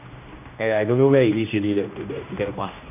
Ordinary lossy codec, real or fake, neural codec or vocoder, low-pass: none; fake; codec, 16 kHz, 1 kbps, X-Codec, HuBERT features, trained on general audio; 3.6 kHz